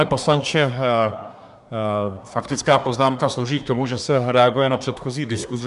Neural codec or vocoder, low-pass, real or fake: codec, 24 kHz, 1 kbps, SNAC; 10.8 kHz; fake